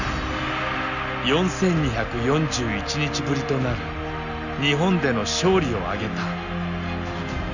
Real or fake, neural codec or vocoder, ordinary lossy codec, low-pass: real; none; none; 7.2 kHz